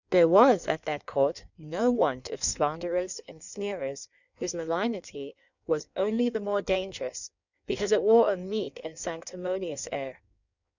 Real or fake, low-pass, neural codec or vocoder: fake; 7.2 kHz; codec, 16 kHz in and 24 kHz out, 1.1 kbps, FireRedTTS-2 codec